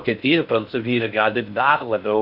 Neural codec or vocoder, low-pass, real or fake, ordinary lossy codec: codec, 16 kHz in and 24 kHz out, 0.6 kbps, FocalCodec, streaming, 4096 codes; 5.4 kHz; fake; AAC, 48 kbps